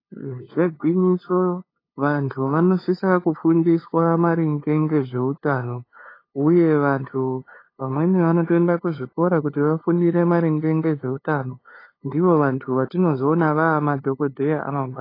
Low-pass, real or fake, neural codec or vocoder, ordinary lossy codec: 5.4 kHz; fake; codec, 16 kHz, 2 kbps, FunCodec, trained on LibriTTS, 25 frames a second; AAC, 24 kbps